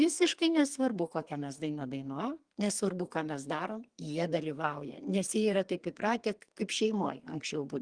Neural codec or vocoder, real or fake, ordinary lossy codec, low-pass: codec, 32 kHz, 1.9 kbps, SNAC; fake; Opus, 24 kbps; 9.9 kHz